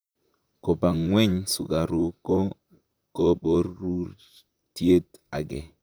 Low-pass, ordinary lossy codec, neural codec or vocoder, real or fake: none; none; vocoder, 44.1 kHz, 128 mel bands, Pupu-Vocoder; fake